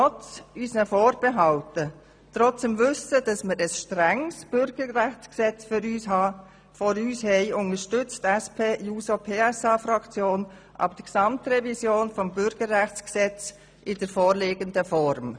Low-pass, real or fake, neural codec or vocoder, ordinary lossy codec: 9.9 kHz; real; none; none